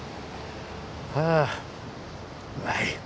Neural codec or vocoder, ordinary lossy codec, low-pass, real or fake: none; none; none; real